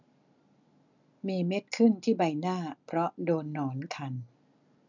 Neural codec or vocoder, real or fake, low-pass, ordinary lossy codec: none; real; 7.2 kHz; none